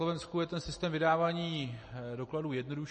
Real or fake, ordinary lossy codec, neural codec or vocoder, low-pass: real; MP3, 32 kbps; none; 10.8 kHz